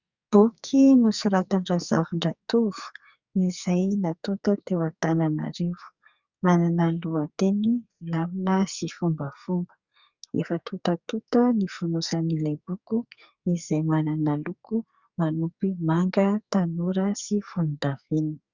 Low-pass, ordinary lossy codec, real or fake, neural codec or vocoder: 7.2 kHz; Opus, 64 kbps; fake; codec, 44.1 kHz, 2.6 kbps, SNAC